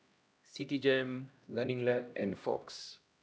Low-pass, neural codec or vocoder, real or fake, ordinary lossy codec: none; codec, 16 kHz, 1 kbps, X-Codec, HuBERT features, trained on LibriSpeech; fake; none